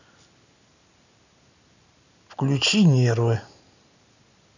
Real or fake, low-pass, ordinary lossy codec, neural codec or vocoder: real; 7.2 kHz; none; none